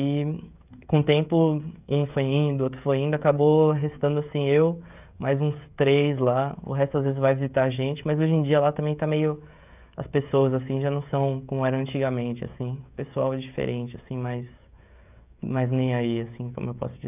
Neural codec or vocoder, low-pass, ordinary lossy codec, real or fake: codec, 16 kHz, 16 kbps, FreqCodec, smaller model; 3.6 kHz; none; fake